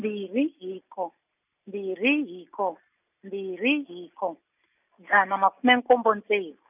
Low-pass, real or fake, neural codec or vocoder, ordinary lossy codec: 3.6 kHz; real; none; none